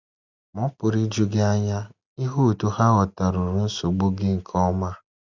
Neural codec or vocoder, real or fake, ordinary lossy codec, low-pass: none; real; none; 7.2 kHz